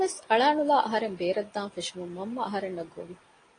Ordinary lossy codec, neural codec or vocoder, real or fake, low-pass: AAC, 32 kbps; none; real; 9.9 kHz